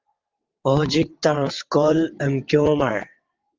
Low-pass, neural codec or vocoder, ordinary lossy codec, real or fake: 7.2 kHz; vocoder, 22.05 kHz, 80 mel bands, WaveNeXt; Opus, 24 kbps; fake